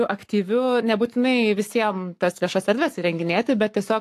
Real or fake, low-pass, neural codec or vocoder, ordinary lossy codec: fake; 14.4 kHz; codec, 44.1 kHz, 7.8 kbps, Pupu-Codec; AAC, 64 kbps